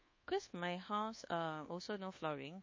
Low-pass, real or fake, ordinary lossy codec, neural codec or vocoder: 7.2 kHz; fake; MP3, 32 kbps; codec, 24 kHz, 1.2 kbps, DualCodec